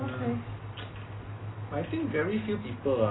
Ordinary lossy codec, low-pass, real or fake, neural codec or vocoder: AAC, 16 kbps; 7.2 kHz; real; none